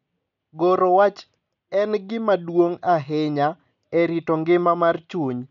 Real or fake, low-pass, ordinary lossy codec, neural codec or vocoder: real; 7.2 kHz; none; none